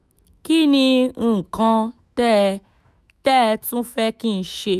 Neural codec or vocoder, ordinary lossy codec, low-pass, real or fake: autoencoder, 48 kHz, 128 numbers a frame, DAC-VAE, trained on Japanese speech; none; 14.4 kHz; fake